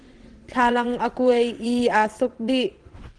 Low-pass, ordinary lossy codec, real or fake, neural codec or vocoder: 9.9 kHz; Opus, 16 kbps; fake; vocoder, 22.05 kHz, 80 mel bands, WaveNeXt